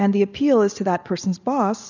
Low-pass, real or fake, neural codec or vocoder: 7.2 kHz; real; none